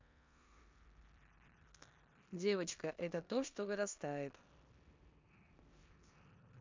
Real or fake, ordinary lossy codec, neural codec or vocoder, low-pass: fake; MP3, 64 kbps; codec, 16 kHz in and 24 kHz out, 0.9 kbps, LongCat-Audio-Codec, four codebook decoder; 7.2 kHz